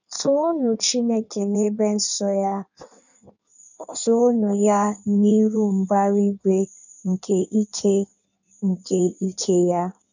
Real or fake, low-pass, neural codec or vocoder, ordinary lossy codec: fake; 7.2 kHz; codec, 16 kHz in and 24 kHz out, 1.1 kbps, FireRedTTS-2 codec; none